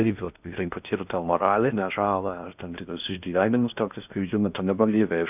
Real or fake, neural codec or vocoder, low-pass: fake; codec, 16 kHz in and 24 kHz out, 0.6 kbps, FocalCodec, streaming, 2048 codes; 3.6 kHz